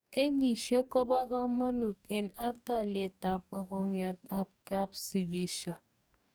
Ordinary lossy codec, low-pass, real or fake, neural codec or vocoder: none; none; fake; codec, 44.1 kHz, 2.6 kbps, DAC